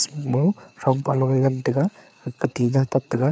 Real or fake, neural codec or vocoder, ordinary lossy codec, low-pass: fake; codec, 16 kHz, 4 kbps, FreqCodec, larger model; none; none